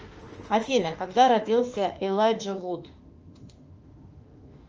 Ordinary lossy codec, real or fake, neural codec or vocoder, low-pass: Opus, 24 kbps; fake; autoencoder, 48 kHz, 32 numbers a frame, DAC-VAE, trained on Japanese speech; 7.2 kHz